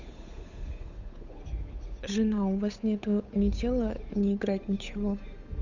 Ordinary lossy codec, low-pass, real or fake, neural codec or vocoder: Opus, 64 kbps; 7.2 kHz; fake; codec, 16 kHz, 8 kbps, FunCodec, trained on Chinese and English, 25 frames a second